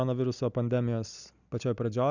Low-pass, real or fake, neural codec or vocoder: 7.2 kHz; real; none